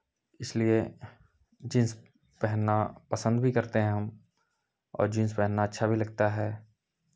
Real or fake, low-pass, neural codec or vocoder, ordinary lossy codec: real; none; none; none